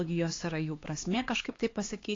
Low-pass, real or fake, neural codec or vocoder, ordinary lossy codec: 7.2 kHz; fake; codec, 16 kHz, 2 kbps, X-Codec, HuBERT features, trained on LibriSpeech; AAC, 32 kbps